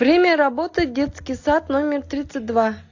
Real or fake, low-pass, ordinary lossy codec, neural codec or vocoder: real; 7.2 kHz; AAC, 48 kbps; none